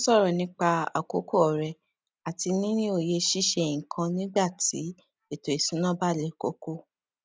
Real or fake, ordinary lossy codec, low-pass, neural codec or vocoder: real; none; none; none